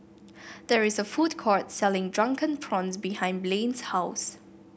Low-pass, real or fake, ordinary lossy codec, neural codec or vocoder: none; real; none; none